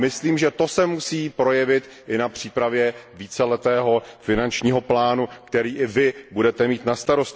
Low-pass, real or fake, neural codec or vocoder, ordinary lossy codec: none; real; none; none